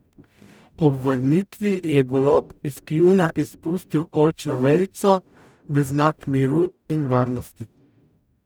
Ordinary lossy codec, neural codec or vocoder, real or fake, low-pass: none; codec, 44.1 kHz, 0.9 kbps, DAC; fake; none